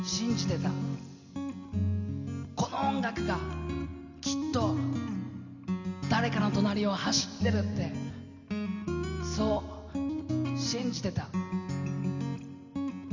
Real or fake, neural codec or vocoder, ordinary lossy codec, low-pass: real; none; none; 7.2 kHz